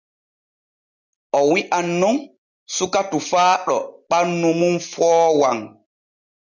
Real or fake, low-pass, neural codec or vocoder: real; 7.2 kHz; none